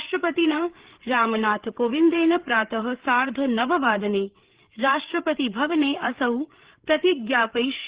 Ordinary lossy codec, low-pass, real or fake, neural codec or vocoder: Opus, 16 kbps; 3.6 kHz; fake; codec, 16 kHz, 8 kbps, FreqCodec, larger model